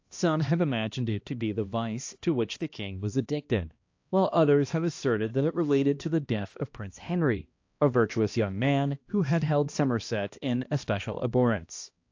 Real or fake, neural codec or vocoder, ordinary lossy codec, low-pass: fake; codec, 16 kHz, 1 kbps, X-Codec, HuBERT features, trained on balanced general audio; AAC, 48 kbps; 7.2 kHz